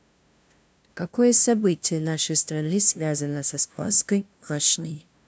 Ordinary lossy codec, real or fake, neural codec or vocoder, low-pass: none; fake; codec, 16 kHz, 0.5 kbps, FunCodec, trained on LibriTTS, 25 frames a second; none